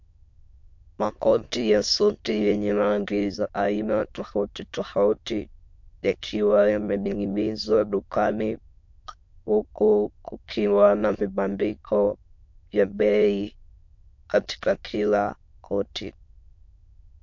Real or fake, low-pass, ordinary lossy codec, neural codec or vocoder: fake; 7.2 kHz; MP3, 48 kbps; autoencoder, 22.05 kHz, a latent of 192 numbers a frame, VITS, trained on many speakers